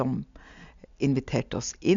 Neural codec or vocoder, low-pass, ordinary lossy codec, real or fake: none; 7.2 kHz; MP3, 64 kbps; real